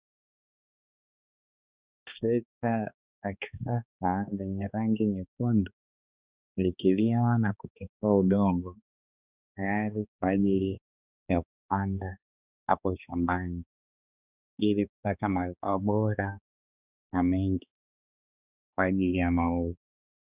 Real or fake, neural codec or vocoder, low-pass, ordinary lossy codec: fake; codec, 16 kHz, 2 kbps, X-Codec, HuBERT features, trained on balanced general audio; 3.6 kHz; Opus, 64 kbps